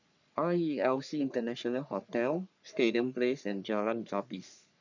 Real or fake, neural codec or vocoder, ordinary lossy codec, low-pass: fake; codec, 44.1 kHz, 3.4 kbps, Pupu-Codec; none; 7.2 kHz